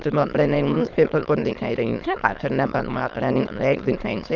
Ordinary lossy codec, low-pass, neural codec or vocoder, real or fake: Opus, 32 kbps; 7.2 kHz; autoencoder, 22.05 kHz, a latent of 192 numbers a frame, VITS, trained on many speakers; fake